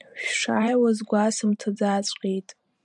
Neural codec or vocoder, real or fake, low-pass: vocoder, 44.1 kHz, 128 mel bands every 512 samples, BigVGAN v2; fake; 10.8 kHz